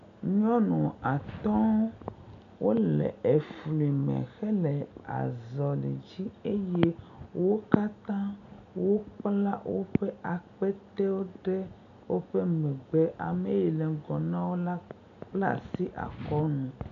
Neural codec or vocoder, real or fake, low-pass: none; real; 7.2 kHz